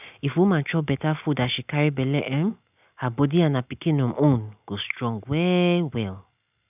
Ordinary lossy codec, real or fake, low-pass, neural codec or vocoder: none; real; 3.6 kHz; none